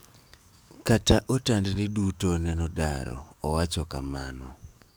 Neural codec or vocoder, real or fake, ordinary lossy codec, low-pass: codec, 44.1 kHz, 7.8 kbps, DAC; fake; none; none